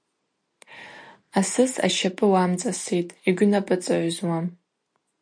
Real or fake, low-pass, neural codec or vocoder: real; 9.9 kHz; none